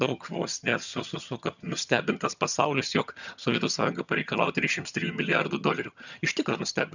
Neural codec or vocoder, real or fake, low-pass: vocoder, 22.05 kHz, 80 mel bands, HiFi-GAN; fake; 7.2 kHz